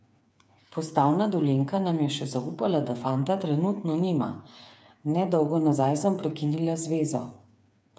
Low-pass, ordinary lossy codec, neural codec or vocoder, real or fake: none; none; codec, 16 kHz, 8 kbps, FreqCodec, smaller model; fake